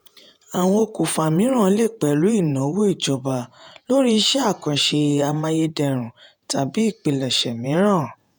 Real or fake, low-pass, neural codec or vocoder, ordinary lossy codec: fake; none; vocoder, 48 kHz, 128 mel bands, Vocos; none